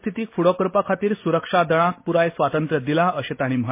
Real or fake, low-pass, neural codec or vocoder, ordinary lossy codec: real; 3.6 kHz; none; MP3, 24 kbps